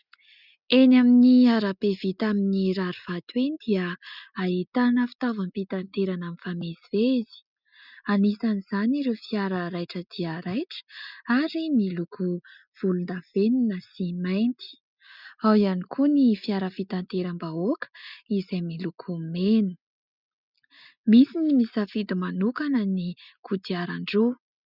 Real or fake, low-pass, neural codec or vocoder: real; 5.4 kHz; none